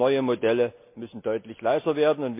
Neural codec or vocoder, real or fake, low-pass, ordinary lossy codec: none; real; 3.6 kHz; MP3, 32 kbps